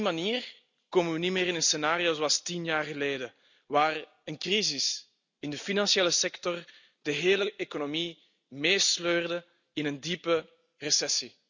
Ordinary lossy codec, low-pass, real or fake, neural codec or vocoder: none; 7.2 kHz; real; none